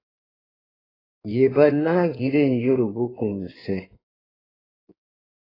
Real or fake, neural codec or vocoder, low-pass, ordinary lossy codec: fake; codec, 16 kHz in and 24 kHz out, 1.1 kbps, FireRedTTS-2 codec; 5.4 kHz; AAC, 24 kbps